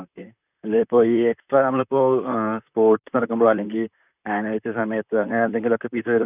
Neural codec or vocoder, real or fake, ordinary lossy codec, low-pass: vocoder, 44.1 kHz, 128 mel bands, Pupu-Vocoder; fake; none; 3.6 kHz